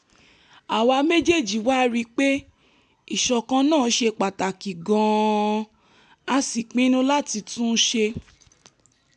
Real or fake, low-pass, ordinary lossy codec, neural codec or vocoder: real; 10.8 kHz; none; none